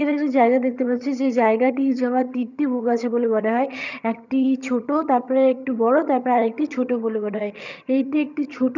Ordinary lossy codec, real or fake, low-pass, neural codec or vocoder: none; fake; 7.2 kHz; vocoder, 22.05 kHz, 80 mel bands, HiFi-GAN